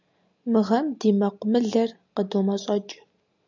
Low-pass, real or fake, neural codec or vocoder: 7.2 kHz; real; none